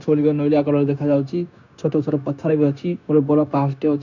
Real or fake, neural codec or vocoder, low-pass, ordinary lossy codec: fake; codec, 16 kHz, 0.9 kbps, LongCat-Audio-Codec; 7.2 kHz; none